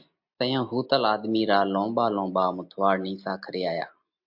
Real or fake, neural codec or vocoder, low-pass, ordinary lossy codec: real; none; 5.4 kHz; MP3, 48 kbps